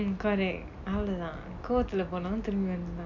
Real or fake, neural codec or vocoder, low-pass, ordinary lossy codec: real; none; 7.2 kHz; none